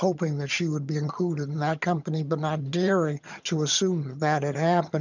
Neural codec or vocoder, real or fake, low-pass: vocoder, 22.05 kHz, 80 mel bands, HiFi-GAN; fake; 7.2 kHz